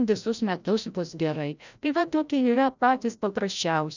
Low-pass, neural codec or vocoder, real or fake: 7.2 kHz; codec, 16 kHz, 0.5 kbps, FreqCodec, larger model; fake